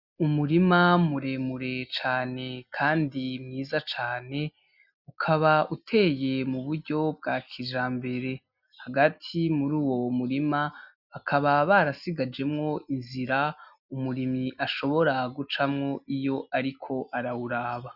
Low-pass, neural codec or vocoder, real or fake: 5.4 kHz; none; real